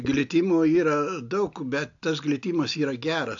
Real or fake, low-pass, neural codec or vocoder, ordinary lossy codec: real; 7.2 kHz; none; AAC, 48 kbps